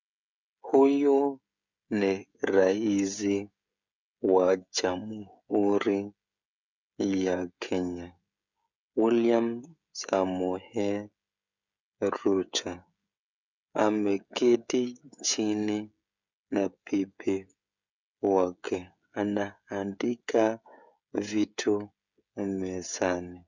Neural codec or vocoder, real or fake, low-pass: codec, 16 kHz, 16 kbps, FreqCodec, smaller model; fake; 7.2 kHz